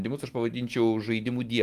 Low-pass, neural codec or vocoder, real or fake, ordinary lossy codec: 14.4 kHz; autoencoder, 48 kHz, 128 numbers a frame, DAC-VAE, trained on Japanese speech; fake; Opus, 24 kbps